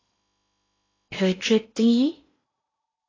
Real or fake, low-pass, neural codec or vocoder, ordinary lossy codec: fake; 7.2 kHz; codec, 16 kHz in and 24 kHz out, 0.8 kbps, FocalCodec, streaming, 65536 codes; AAC, 32 kbps